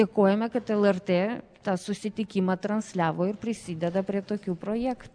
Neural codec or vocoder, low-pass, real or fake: none; 9.9 kHz; real